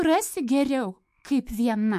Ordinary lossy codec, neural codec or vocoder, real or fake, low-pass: MP3, 64 kbps; autoencoder, 48 kHz, 128 numbers a frame, DAC-VAE, trained on Japanese speech; fake; 14.4 kHz